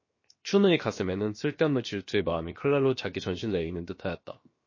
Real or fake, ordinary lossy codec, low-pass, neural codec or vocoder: fake; MP3, 32 kbps; 7.2 kHz; codec, 16 kHz, 0.7 kbps, FocalCodec